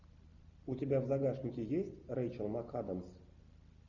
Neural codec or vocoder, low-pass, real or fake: none; 7.2 kHz; real